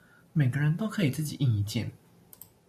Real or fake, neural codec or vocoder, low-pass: real; none; 14.4 kHz